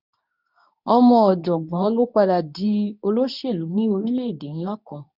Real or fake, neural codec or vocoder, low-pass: fake; codec, 24 kHz, 0.9 kbps, WavTokenizer, medium speech release version 2; 5.4 kHz